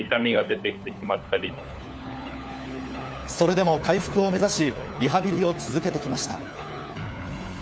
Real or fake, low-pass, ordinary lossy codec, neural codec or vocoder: fake; none; none; codec, 16 kHz, 4 kbps, FunCodec, trained on LibriTTS, 50 frames a second